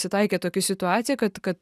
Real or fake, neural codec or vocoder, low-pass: real; none; 14.4 kHz